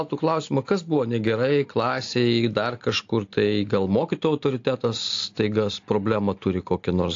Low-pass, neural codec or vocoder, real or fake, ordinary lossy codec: 7.2 kHz; none; real; AAC, 48 kbps